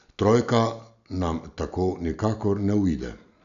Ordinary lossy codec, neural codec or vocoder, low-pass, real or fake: none; none; 7.2 kHz; real